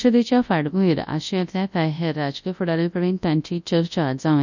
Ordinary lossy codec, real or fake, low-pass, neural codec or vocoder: none; fake; 7.2 kHz; codec, 24 kHz, 0.9 kbps, WavTokenizer, large speech release